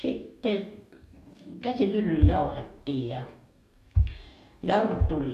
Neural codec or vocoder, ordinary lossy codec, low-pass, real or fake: codec, 44.1 kHz, 2.6 kbps, DAC; none; 14.4 kHz; fake